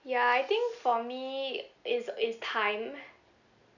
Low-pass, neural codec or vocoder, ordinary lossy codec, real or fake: 7.2 kHz; none; none; real